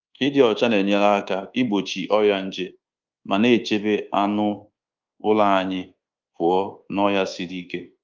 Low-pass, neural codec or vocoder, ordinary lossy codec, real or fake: 7.2 kHz; codec, 24 kHz, 1.2 kbps, DualCodec; Opus, 32 kbps; fake